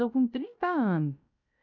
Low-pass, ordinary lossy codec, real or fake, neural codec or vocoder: 7.2 kHz; none; fake; codec, 16 kHz, 0.3 kbps, FocalCodec